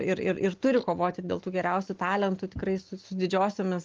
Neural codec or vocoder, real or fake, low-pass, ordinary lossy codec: none; real; 7.2 kHz; Opus, 24 kbps